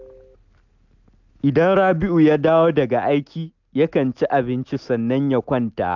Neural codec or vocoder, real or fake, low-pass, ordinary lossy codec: none; real; 7.2 kHz; none